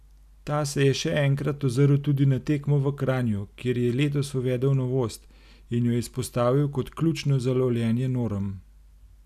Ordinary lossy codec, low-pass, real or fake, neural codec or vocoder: none; 14.4 kHz; real; none